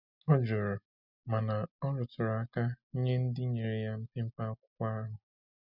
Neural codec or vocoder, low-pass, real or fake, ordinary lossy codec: none; 5.4 kHz; real; none